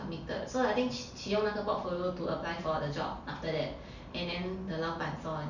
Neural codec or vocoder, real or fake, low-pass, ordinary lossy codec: none; real; 7.2 kHz; none